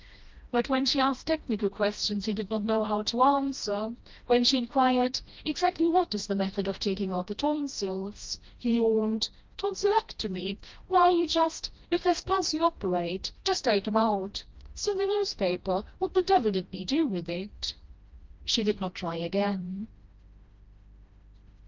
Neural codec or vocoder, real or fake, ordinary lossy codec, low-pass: codec, 16 kHz, 1 kbps, FreqCodec, smaller model; fake; Opus, 24 kbps; 7.2 kHz